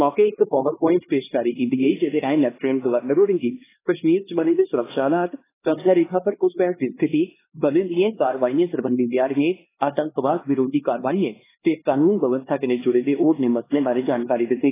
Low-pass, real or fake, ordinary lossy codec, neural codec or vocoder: 3.6 kHz; fake; AAC, 16 kbps; codec, 16 kHz, 2 kbps, X-Codec, HuBERT features, trained on LibriSpeech